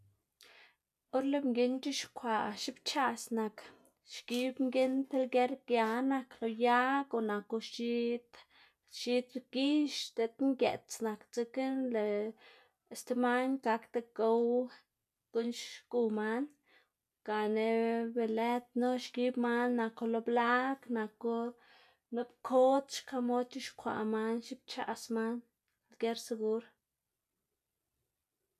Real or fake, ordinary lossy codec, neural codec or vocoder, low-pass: real; none; none; 14.4 kHz